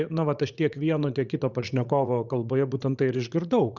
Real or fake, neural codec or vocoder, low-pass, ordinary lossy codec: fake; vocoder, 44.1 kHz, 80 mel bands, Vocos; 7.2 kHz; Opus, 64 kbps